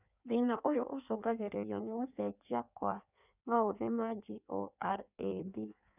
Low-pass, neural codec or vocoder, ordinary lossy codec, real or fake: 3.6 kHz; codec, 16 kHz in and 24 kHz out, 1.1 kbps, FireRedTTS-2 codec; none; fake